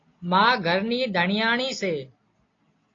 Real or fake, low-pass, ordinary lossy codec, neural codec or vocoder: real; 7.2 kHz; AAC, 32 kbps; none